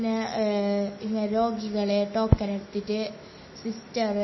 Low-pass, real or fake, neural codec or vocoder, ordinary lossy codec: 7.2 kHz; fake; autoencoder, 48 kHz, 128 numbers a frame, DAC-VAE, trained on Japanese speech; MP3, 24 kbps